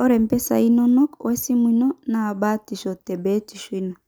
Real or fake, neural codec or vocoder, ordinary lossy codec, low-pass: real; none; none; none